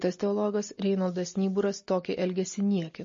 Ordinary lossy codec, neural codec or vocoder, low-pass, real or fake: MP3, 32 kbps; none; 7.2 kHz; real